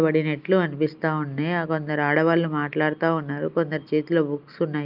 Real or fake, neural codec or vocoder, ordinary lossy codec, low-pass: real; none; Opus, 24 kbps; 5.4 kHz